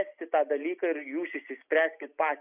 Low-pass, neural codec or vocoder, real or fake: 3.6 kHz; none; real